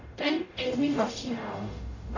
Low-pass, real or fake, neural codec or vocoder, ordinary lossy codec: 7.2 kHz; fake; codec, 44.1 kHz, 0.9 kbps, DAC; none